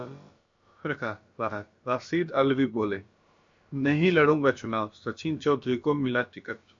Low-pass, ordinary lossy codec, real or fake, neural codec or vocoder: 7.2 kHz; MP3, 48 kbps; fake; codec, 16 kHz, about 1 kbps, DyCAST, with the encoder's durations